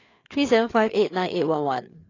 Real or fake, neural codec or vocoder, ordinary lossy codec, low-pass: fake; codec, 16 kHz, 4 kbps, FreqCodec, larger model; AAC, 32 kbps; 7.2 kHz